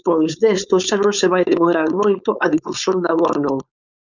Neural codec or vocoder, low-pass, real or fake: codec, 16 kHz, 4.8 kbps, FACodec; 7.2 kHz; fake